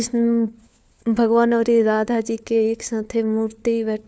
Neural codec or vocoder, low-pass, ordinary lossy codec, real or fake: codec, 16 kHz, 4 kbps, FunCodec, trained on LibriTTS, 50 frames a second; none; none; fake